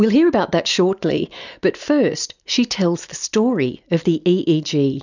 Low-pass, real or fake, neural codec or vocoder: 7.2 kHz; real; none